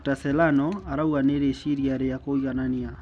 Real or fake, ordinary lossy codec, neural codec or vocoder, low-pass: real; none; none; none